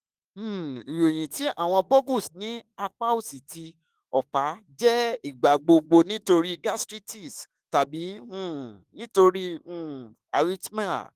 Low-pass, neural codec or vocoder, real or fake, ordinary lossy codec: 14.4 kHz; autoencoder, 48 kHz, 32 numbers a frame, DAC-VAE, trained on Japanese speech; fake; Opus, 24 kbps